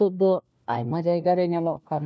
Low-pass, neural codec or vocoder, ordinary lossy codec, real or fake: none; codec, 16 kHz, 1 kbps, FunCodec, trained on LibriTTS, 50 frames a second; none; fake